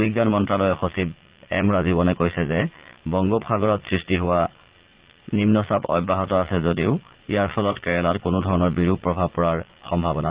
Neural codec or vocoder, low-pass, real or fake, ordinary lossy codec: vocoder, 22.05 kHz, 80 mel bands, Vocos; 3.6 kHz; fake; Opus, 16 kbps